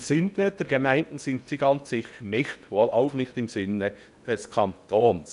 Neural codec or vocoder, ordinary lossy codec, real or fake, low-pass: codec, 16 kHz in and 24 kHz out, 0.8 kbps, FocalCodec, streaming, 65536 codes; none; fake; 10.8 kHz